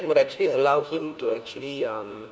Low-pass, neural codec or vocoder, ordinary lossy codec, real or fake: none; codec, 16 kHz, 1 kbps, FunCodec, trained on LibriTTS, 50 frames a second; none; fake